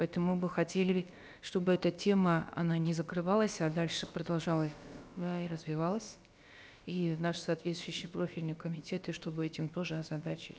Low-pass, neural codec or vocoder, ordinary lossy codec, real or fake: none; codec, 16 kHz, about 1 kbps, DyCAST, with the encoder's durations; none; fake